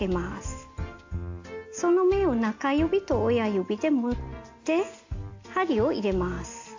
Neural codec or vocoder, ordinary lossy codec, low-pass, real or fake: none; AAC, 48 kbps; 7.2 kHz; real